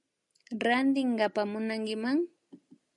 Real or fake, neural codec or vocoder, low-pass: real; none; 10.8 kHz